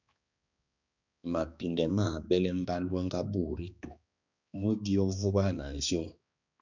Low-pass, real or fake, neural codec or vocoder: 7.2 kHz; fake; codec, 16 kHz, 2 kbps, X-Codec, HuBERT features, trained on balanced general audio